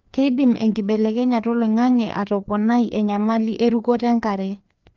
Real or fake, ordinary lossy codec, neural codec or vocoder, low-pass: fake; Opus, 24 kbps; codec, 16 kHz, 2 kbps, FreqCodec, larger model; 7.2 kHz